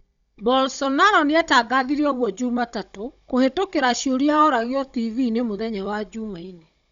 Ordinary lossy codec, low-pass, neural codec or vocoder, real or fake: Opus, 64 kbps; 7.2 kHz; codec, 16 kHz, 16 kbps, FunCodec, trained on Chinese and English, 50 frames a second; fake